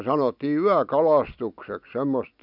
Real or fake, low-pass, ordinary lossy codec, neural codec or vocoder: real; 5.4 kHz; none; none